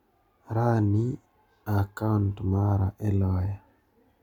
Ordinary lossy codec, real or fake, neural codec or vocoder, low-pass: MP3, 96 kbps; real; none; 19.8 kHz